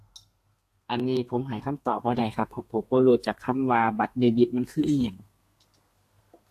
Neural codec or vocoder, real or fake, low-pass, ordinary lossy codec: codec, 32 kHz, 1.9 kbps, SNAC; fake; 14.4 kHz; AAC, 64 kbps